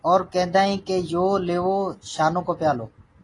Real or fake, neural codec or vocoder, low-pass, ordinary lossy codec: real; none; 10.8 kHz; AAC, 32 kbps